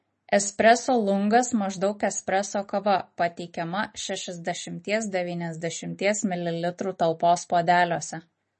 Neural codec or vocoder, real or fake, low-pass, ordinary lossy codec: none; real; 10.8 kHz; MP3, 32 kbps